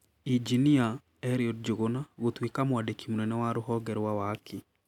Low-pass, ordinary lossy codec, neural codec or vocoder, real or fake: 19.8 kHz; none; none; real